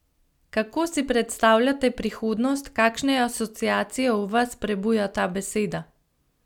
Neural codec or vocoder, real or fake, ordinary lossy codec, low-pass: none; real; none; 19.8 kHz